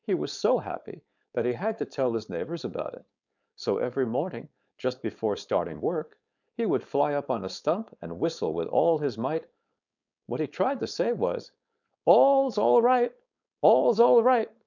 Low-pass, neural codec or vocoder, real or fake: 7.2 kHz; codec, 16 kHz, 4.8 kbps, FACodec; fake